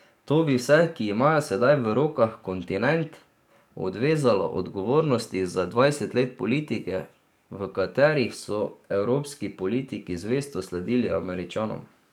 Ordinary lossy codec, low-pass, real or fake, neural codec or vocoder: none; 19.8 kHz; fake; codec, 44.1 kHz, 7.8 kbps, DAC